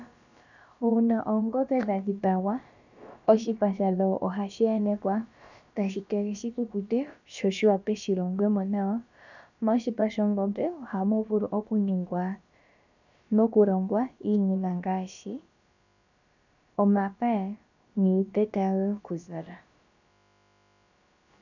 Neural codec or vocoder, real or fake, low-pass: codec, 16 kHz, about 1 kbps, DyCAST, with the encoder's durations; fake; 7.2 kHz